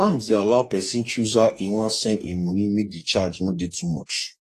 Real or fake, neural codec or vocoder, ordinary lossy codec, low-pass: fake; codec, 44.1 kHz, 2.6 kbps, DAC; AAC, 48 kbps; 14.4 kHz